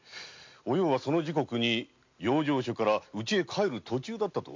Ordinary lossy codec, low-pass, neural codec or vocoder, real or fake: MP3, 48 kbps; 7.2 kHz; none; real